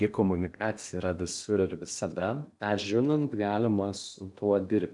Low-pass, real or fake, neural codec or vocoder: 10.8 kHz; fake; codec, 16 kHz in and 24 kHz out, 0.8 kbps, FocalCodec, streaming, 65536 codes